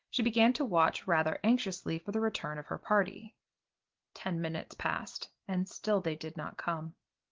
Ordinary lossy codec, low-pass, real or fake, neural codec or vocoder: Opus, 16 kbps; 7.2 kHz; real; none